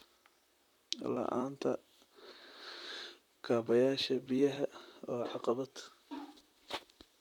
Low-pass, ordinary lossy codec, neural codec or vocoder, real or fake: 19.8 kHz; none; vocoder, 44.1 kHz, 128 mel bands, Pupu-Vocoder; fake